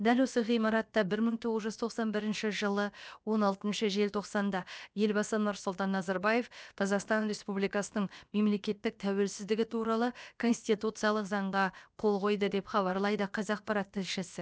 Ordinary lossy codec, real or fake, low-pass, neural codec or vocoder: none; fake; none; codec, 16 kHz, about 1 kbps, DyCAST, with the encoder's durations